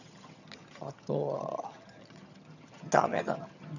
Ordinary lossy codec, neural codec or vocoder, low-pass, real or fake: none; vocoder, 22.05 kHz, 80 mel bands, HiFi-GAN; 7.2 kHz; fake